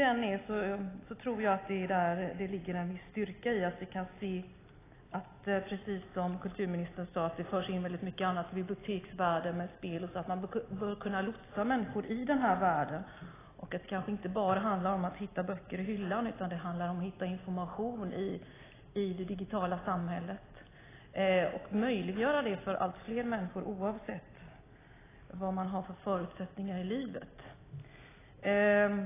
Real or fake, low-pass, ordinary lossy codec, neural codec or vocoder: real; 3.6 kHz; AAC, 16 kbps; none